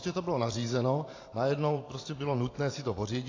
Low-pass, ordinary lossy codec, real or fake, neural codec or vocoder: 7.2 kHz; AAC, 32 kbps; fake; vocoder, 44.1 kHz, 128 mel bands every 512 samples, BigVGAN v2